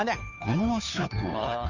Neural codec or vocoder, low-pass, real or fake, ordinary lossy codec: codec, 16 kHz, 2 kbps, FunCodec, trained on Chinese and English, 25 frames a second; 7.2 kHz; fake; none